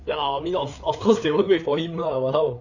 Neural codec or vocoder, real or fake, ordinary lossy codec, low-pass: codec, 16 kHz, 4 kbps, FreqCodec, larger model; fake; none; 7.2 kHz